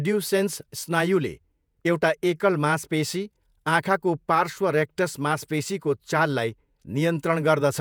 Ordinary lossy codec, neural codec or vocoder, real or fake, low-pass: none; vocoder, 48 kHz, 128 mel bands, Vocos; fake; none